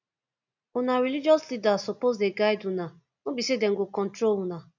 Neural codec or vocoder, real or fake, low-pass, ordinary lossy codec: none; real; 7.2 kHz; none